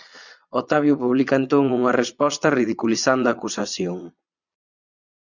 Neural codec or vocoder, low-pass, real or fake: vocoder, 22.05 kHz, 80 mel bands, Vocos; 7.2 kHz; fake